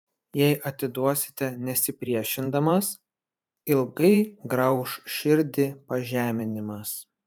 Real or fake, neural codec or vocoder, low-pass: fake; vocoder, 44.1 kHz, 128 mel bands every 512 samples, BigVGAN v2; 19.8 kHz